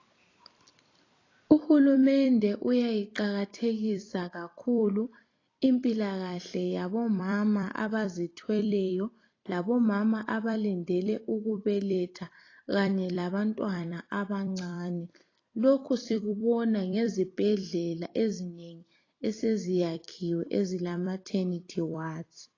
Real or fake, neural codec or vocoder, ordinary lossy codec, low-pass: fake; vocoder, 44.1 kHz, 128 mel bands every 256 samples, BigVGAN v2; AAC, 32 kbps; 7.2 kHz